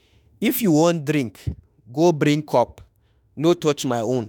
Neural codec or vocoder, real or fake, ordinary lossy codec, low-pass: autoencoder, 48 kHz, 32 numbers a frame, DAC-VAE, trained on Japanese speech; fake; none; 19.8 kHz